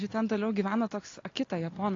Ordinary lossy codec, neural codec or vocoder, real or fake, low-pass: AAC, 32 kbps; none; real; 7.2 kHz